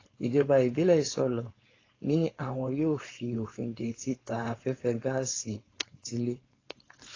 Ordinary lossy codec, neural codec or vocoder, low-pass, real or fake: AAC, 32 kbps; codec, 16 kHz, 4.8 kbps, FACodec; 7.2 kHz; fake